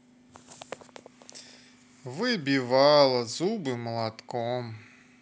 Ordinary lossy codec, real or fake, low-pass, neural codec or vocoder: none; real; none; none